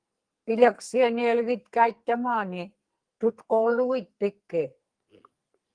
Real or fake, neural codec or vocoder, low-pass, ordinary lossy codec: fake; codec, 44.1 kHz, 2.6 kbps, SNAC; 9.9 kHz; Opus, 24 kbps